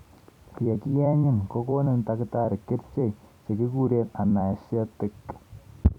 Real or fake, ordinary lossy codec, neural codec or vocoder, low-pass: fake; none; vocoder, 44.1 kHz, 128 mel bands every 256 samples, BigVGAN v2; 19.8 kHz